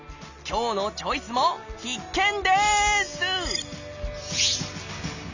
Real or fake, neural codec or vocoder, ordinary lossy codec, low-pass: real; none; none; 7.2 kHz